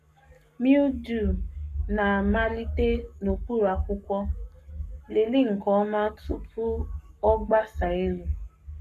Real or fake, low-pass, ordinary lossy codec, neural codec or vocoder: fake; 14.4 kHz; none; codec, 44.1 kHz, 7.8 kbps, Pupu-Codec